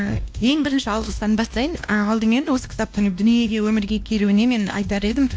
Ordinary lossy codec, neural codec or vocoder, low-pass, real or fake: none; codec, 16 kHz, 1 kbps, X-Codec, WavLM features, trained on Multilingual LibriSpeech; none; fake